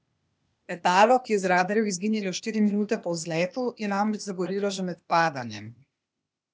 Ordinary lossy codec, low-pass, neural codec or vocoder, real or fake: none; none; codec, 16 kHz, 0.8 kbps, ZipCodec; fake